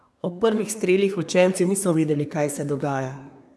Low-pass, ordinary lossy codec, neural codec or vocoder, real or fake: none; none; codec, 24 kHz, 1 kbps, SNAC; fake